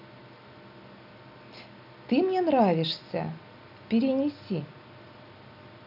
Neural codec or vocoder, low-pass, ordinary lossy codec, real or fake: none; 5.4 kHz; none; real